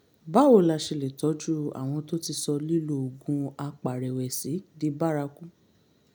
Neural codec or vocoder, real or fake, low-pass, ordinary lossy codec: none; real; none; none